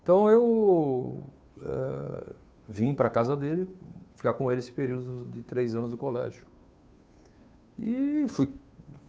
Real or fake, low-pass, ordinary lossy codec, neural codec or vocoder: fake; none; none; codec, 16 kHz, 2 kbps, FunCodec, trained on Chinese and English, 25 frames a second